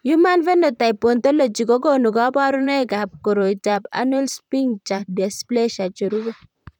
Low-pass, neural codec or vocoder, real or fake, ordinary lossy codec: 19.8 kHz; vocoder, 44.1 kHz, 128 mel bands, Pupu-Vocoder; fake; none